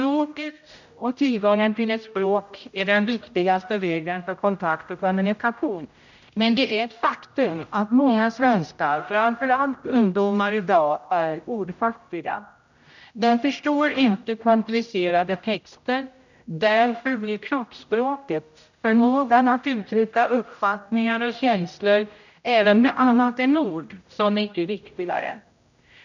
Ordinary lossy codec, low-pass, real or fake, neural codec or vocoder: none; 7.2 kHz; fake; codec, 16 kHz, 0.5 kbps, X-Codec, HuBERT features, trained on general audio